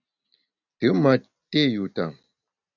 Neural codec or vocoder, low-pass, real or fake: none; 7.2 kHz; real